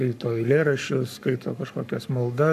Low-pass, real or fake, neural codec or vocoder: 14.4 kHz; fake; codec, 44.1 kHz, 7.8 kbps, Pupu-Codec